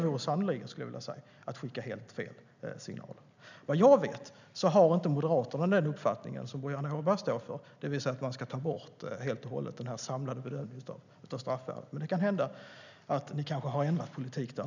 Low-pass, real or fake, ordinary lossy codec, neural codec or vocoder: 7.2 kHz; real; none; none